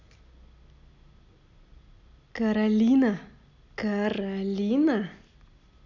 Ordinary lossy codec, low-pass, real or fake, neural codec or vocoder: none; 7.2 kHz; real; none